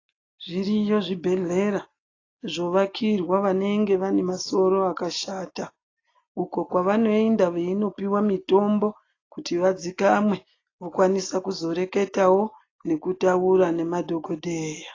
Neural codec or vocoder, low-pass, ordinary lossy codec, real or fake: none; 7.2 kHz; AAC, 32 kbps; real